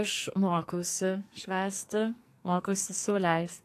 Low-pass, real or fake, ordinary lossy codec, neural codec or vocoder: 14.4 kHz; fake; AAC, 64 kbps; codec, 44.1 kHz, 2.6 kbps, SNAC